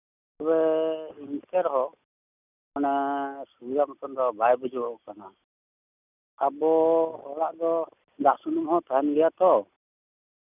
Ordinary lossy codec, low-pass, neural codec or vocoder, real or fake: none; 3.6 kHz; none; real